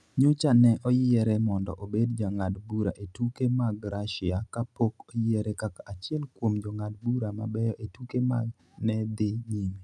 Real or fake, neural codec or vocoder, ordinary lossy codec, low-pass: real; none; none; none